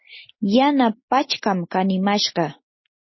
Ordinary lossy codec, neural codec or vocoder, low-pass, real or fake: MP3, 24 kbps; none; 7.2 kHz; real